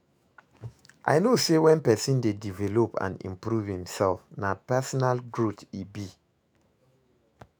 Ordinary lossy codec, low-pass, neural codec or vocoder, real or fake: none; none; autoencoder, 48 kHz, 128 numbers a frame, DAC-VAE, trained on Japanese speech; fake